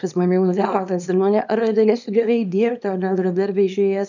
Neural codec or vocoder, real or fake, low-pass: codec, 24 kHz, 0.9 kbps, WavTokenizer, small release; fake; 7.2 kHz